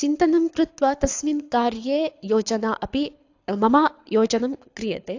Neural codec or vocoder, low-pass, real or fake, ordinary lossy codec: codec, 24 kHz, 6 kbps, HILCodec; 7.2 kHz; fake; AAC, 48 kbps